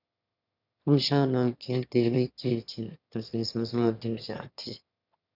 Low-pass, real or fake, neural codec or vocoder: 5.4 kHz; fake; autoencoder, 22.05 kHz, a latent of 192 numbers a frame, VITS, trained on one speaker